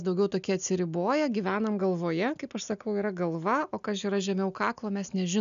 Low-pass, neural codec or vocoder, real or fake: 7.2 kHz; none; real